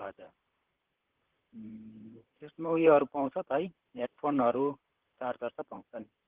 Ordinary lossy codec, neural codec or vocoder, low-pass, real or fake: Opus, 16 kbps; vocoder, 44.1 kHz, 128 mel bands, Pupu-Vocoder; 3.6 kHz; fake